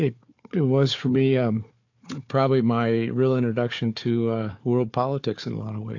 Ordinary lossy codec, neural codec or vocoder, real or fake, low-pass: AAC, 48 kbps; codec, 16 kHz, 4 kbps, FunCodec, trained on Chinese and English, 50 frames a second; fake; 7.2 kHz